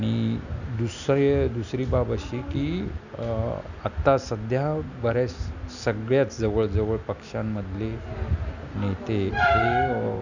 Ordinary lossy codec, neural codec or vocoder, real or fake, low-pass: none; none; real; 7.2 kHz